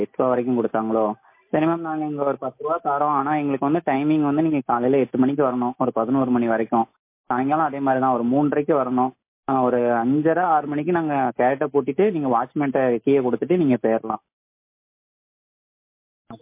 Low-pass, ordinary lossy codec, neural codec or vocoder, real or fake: 3.6 kHz; MP3, 24 kbps; none; real